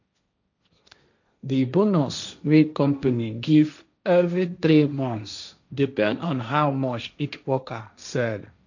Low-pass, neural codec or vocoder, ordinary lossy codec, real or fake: 7.2 kHz; codec, 16 kHz, 1.1 kbps, Voila-Tokenizer; none; fake